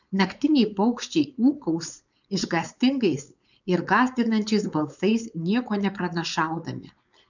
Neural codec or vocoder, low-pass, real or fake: codec, 16 kHz, 4.8 kbps, FACodec; 7.2 kHz; fake